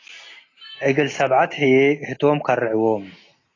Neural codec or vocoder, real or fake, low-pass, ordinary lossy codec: none; real; 7.2 kHz; AAC, 32 kbps